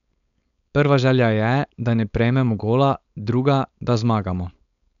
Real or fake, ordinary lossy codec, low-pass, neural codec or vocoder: fake; none; 7.2 kHz; codec, 16 kHz, 4.8 kbps, FACodec